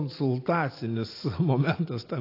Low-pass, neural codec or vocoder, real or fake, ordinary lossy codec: 5.4 kHz; none; real; AAC, 32 kbps